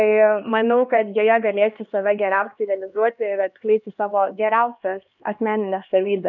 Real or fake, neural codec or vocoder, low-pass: fake; codec, 16 kHz, 2 kbps, X-Codec, HuBERT features, trained on LibriSpeech; 7.2 kHz